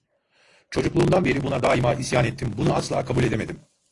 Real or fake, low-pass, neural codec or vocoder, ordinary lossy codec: real; 10.8 kHz; none; AAC, 48 kbps